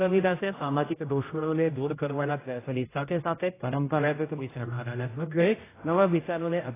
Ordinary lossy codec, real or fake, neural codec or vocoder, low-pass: AAC, 16 kbps; fake; codec, 16 kHz, 0.5 kbps, X-Codec, HuBERT features, trained on general audio; 3.6 kHz